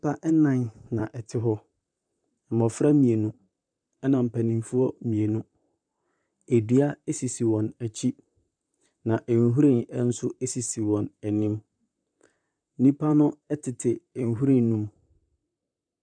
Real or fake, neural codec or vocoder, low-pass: fake; vocoder, 44.1 kHz, 128 mel bands, Pupu-Vocoder; 9.9 kHz